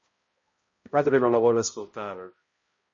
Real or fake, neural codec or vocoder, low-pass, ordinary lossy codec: fake; codec, 16 kHz, 0.5 kbps, X-Codec, HuBERT features, trained on balanced general audio; 7.2 kHz; MP3, 32 kbps